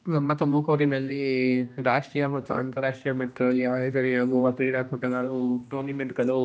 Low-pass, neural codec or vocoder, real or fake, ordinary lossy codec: none; codec, 16 kHz, 1 kbps, X-Codec, HuBERT features, trained on general audio; fake; none